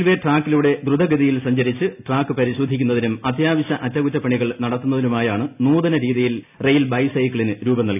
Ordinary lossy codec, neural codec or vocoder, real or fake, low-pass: none; none; real; 3.6 kHz